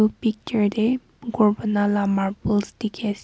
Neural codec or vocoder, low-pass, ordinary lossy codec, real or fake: none; none; none; real